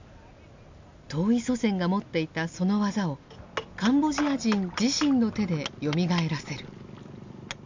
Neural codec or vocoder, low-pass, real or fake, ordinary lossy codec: none; 7.2 kHz; real; MP3, 64 kbps